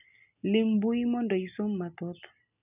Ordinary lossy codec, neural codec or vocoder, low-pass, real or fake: none; none; 3.6 kHz; real